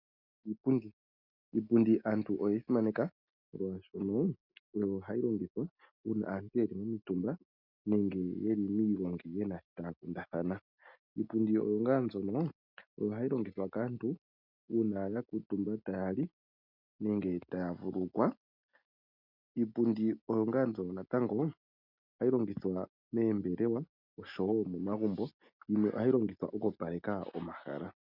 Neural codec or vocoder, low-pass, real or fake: none; 5.4 kHz; real